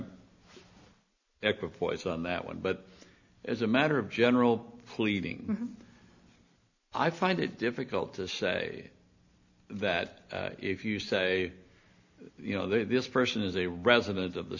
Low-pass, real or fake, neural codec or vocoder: 7.2 kHz; real; none